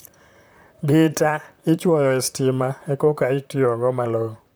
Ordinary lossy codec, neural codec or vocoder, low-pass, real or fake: none; vocoder, 44.1 kHz, 128 mel bands, Pupu-Vocoder; none; fake